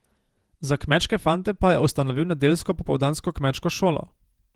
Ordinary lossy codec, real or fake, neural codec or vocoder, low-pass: Opus, 24 kbps; fake; vocoder, 48 kHz, 128 mel bands, Vocos; 19.8 kHz